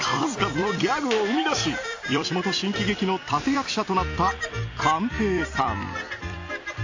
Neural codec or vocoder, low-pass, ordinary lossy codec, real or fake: vocoder, 44.1 kHz, 128 mel bands every 256 samples, BigVGAN v2; 7.2 kHz; AAC, 48 kbps; fake